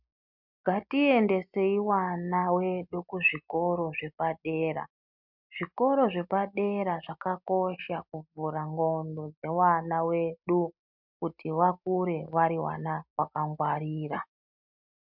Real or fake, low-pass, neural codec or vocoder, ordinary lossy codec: real; 5.4 kHz; none; MP3, 48 kbps